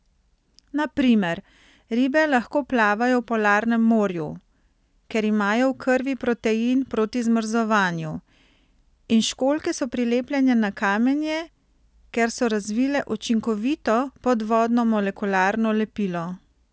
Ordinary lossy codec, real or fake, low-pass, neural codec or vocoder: none; real; none; none